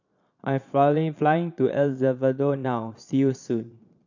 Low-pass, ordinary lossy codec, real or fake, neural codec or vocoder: 7.2 kHz; none; fake; vocoder, 22.05 kHz, 80 mel bands, Vocos